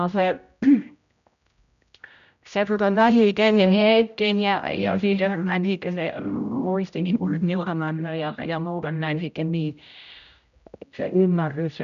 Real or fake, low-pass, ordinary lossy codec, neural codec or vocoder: fake; 7.2 kHz; none; codec, 16 kHz, 0.5 kbps, X-Codec, HuBERT features, trained on general audio